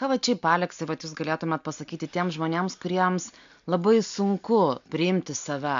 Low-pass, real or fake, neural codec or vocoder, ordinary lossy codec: 7.2 kHz; real; none; MP3, 64 kbps